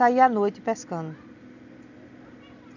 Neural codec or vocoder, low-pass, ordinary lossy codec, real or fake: none; 7.2 kHz; none; real